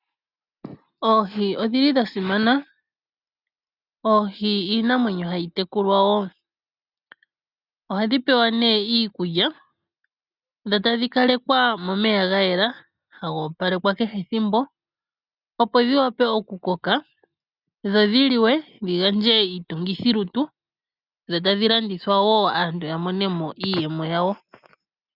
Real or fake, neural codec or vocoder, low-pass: real; none; 5.4 kHz